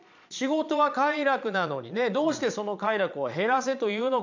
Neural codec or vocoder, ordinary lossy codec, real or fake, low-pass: vocoder, 22.05 kHz, 80 mel bands, Vocos; MP3, 64 kbps; fake; 7.2 kHz